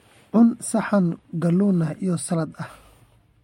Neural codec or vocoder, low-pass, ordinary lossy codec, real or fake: none; 19.8 kHz; MP3, 64 kbps; real